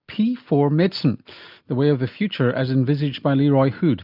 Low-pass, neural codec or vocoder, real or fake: 5.4 kHz; none; real